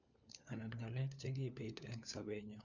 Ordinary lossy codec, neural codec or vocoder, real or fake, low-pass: none; codec, 16 kHz, 4 kbps, FunCodec, trained on LibriTTS, 50 frames a second; fake; 7.2 kHz